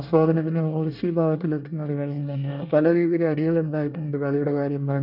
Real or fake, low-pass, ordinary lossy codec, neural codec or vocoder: fake; 5.4 kHz; none; codec, 24 kHz, 1 kbps, SNAC